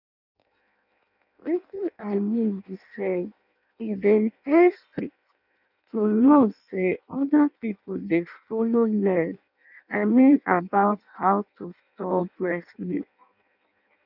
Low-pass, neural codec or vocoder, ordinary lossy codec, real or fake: 5.4 kHz; codec, 16 kHz in and 24 kHz out, 0.6 kbps, FireRedTTS-2 codec; none; fake